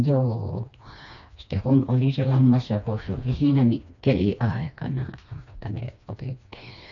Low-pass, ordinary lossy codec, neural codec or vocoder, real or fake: 7.2 kHz; none; codec, 16 kHz, 2 kbps, FreqCodec, smaller model; fake